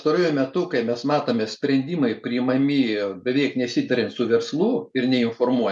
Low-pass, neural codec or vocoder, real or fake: 10.8 kHz; none; real